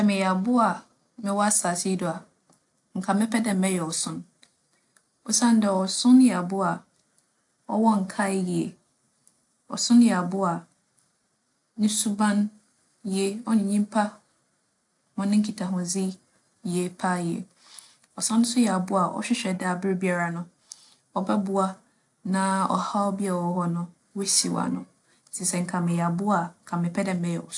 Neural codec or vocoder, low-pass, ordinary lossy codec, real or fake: none; 10.8 kHz; AAC, 64 kbps; real